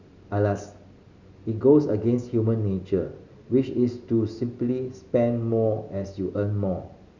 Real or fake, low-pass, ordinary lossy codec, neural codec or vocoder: real; 7.2 kHz; none; none